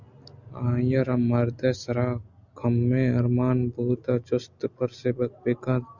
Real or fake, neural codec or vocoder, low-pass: real; none; 7.2 kHz